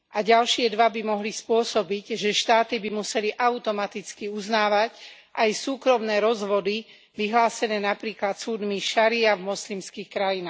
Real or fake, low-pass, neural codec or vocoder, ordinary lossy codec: real; none; none; none